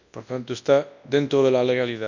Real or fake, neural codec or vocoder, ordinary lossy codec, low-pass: fake; codec, 24 kHz, 0.9 kbps, WavTokenizer, large speech release; none; 7.2 kHz